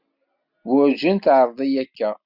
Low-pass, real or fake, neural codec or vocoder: 5.4 kHz; real; none